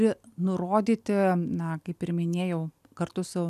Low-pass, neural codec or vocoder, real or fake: 14.4 kHz; none; real